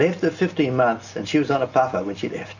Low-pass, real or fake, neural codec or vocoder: 7.2 kHz; real; none